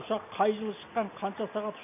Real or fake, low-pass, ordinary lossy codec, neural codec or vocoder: real; 3.6 kHz; none; none